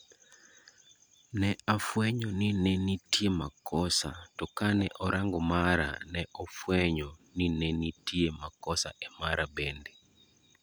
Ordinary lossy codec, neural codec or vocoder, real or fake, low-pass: none; none; real; none